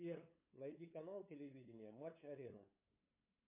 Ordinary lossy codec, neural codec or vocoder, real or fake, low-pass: MP3, 24 kbps; codec, 16 kHz, 8 kbps, FunCodec, trained on LibriTTS, 25 frames a second; fake; 3.6 kHz